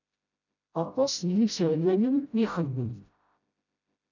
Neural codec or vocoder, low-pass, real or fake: codec, 16 kHz, 0.5 kbps, FreqCodec, smaller model; 7.2 kHz; fake